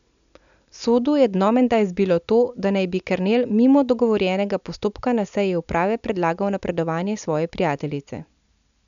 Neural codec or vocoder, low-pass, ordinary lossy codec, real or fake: none; 7.2 kHz; none; real